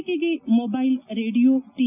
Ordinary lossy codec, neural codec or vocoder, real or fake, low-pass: none; none; real; 3.6 kHz